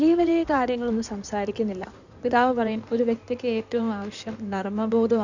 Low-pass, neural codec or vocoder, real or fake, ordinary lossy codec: 7.2 kHz; codec, 16 kHz in and 24 kHz out, 2.2 kbps, FireRedTTS-2 codec; fake; none